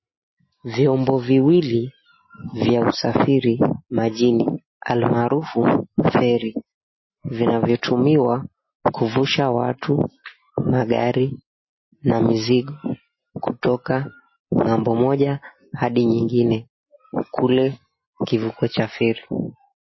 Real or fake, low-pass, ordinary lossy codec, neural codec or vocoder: real; 7.2 kHz; MP3, 24 kbps; none